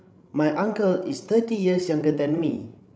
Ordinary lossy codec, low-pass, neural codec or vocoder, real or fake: none; none; codec, 16 kHz, 16 kbps, FreqCodec, larger model; fake